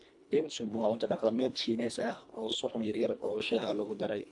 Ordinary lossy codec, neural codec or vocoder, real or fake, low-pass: AAC, 64 kbps; codec, 24 kHz, 1.5 kbps, HILCodec; fake; 10.8 kHz